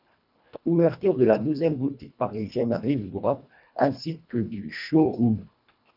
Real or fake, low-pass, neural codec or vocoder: fake; 5.4 kHz; codec, 24 kHz, 1.5 kbps, HILCodec